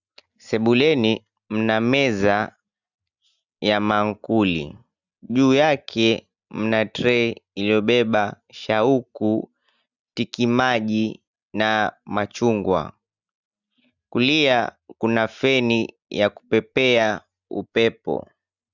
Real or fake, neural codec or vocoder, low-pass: real; none; 7.2 kHz